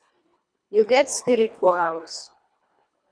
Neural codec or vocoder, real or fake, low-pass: codec, 24 kHz, 1.5 kbps, HILCodec; fake; 9.9 kHz